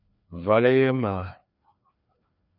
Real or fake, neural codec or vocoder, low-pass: fake; codec, 16 kHz, 2 kbps, FreqCodec, larger model; 5.4 kHz